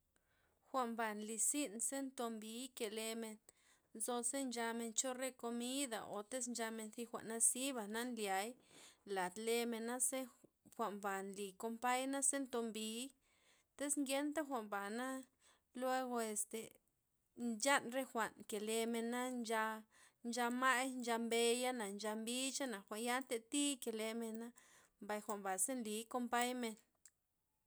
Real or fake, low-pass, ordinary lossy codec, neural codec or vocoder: real; none; none; none